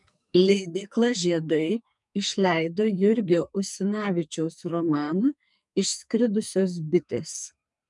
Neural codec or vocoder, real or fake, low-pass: codec, 44.1 kHz, 2.6 kbps, SNAC; fake; 10.8 kHz